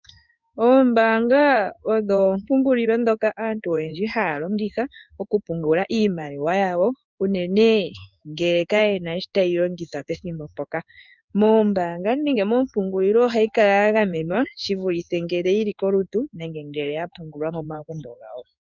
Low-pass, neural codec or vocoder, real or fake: 7.2 kHz; codec, 16 kHz in and 24 kHz out, 1 kbps, XY-Tokenizer; fake